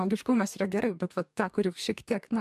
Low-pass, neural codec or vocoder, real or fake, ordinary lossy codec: 14.4 kHz; codec, 44.1 kHz, 2.6 kbps, DAC; fake; AAC, 96 kbps